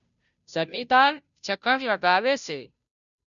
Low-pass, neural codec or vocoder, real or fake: 7.2 kHz; codec, 16 kHz, 0.5 kbps, FunCodec, trained on Chinese and English, 25 frames a second; fake